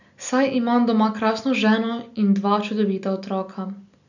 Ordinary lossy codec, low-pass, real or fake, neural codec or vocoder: none; 7.2 kHz; real; none